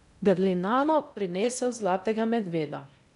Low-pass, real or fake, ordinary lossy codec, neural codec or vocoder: 10.8 kHz; fake; none; codec, 16 kHz in and 24 kHz out, 0.6 kbps, FocalCodec, streaming, 2048 codes